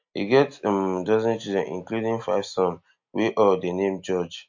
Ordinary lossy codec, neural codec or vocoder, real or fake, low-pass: MP3, 48 kbps; none; real; 7.2 kHz